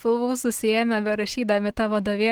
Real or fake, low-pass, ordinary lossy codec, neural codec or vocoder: fake; 19.8 kHz; Opus, 16 kbps; autoencoder, 48 kHz, 128 numbers a frame, DAC-VAE, trained on Japanese speech